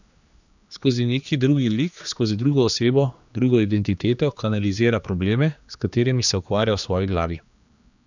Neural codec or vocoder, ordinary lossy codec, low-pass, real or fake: codec, 16 kHz, 2 kbps, X-Codec, HuBERT features, trained on general audio; none; 7.2 kHz; fake